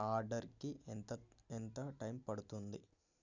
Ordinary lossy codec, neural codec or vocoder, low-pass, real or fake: none; none; 7.2 kHz; real